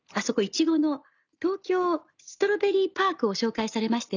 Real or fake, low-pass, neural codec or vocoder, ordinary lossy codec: real; 7.2 kHz; none; none